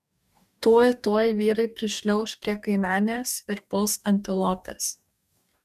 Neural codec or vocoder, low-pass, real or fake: codec, 44.1 kHz, 2.6 kbps, DAC; 14.4 kHz; fake